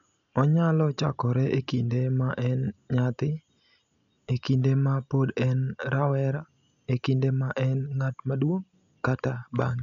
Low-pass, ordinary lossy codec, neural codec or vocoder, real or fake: 7.2 kHz; MP3, 96 kbps; none; real